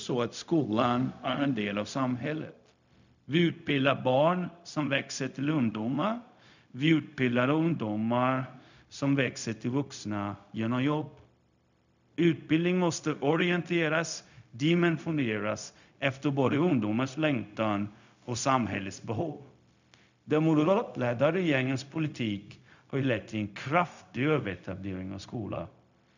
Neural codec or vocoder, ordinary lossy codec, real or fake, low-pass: codec, 16 kHz, 0.4 kbps, LongCat-Audio-Codec; none; fake; 7.2 kHz